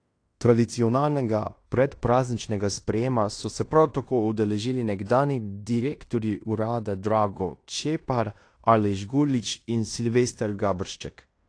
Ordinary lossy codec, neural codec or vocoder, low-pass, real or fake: AAC, 48 kbps; codec, 16 kHz in and 24 kHz out, 0.9 kbps, LongCat-Audio-Codec, fine tuned four codebook decoder; 9.9 kHz; fake